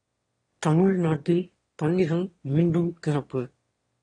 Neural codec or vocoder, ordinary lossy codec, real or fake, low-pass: autoencoder, 22.05 kHz, a latent of 192 numbers a frame, VITS, trained on one speaker; AAC, 32 kbps; fake; 9.9 kHz